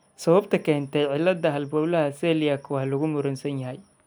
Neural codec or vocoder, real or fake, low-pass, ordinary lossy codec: none; real; none; none